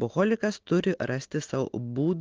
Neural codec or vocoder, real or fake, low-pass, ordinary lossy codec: none; real; 7.2 kHz; Opus, 16 kbps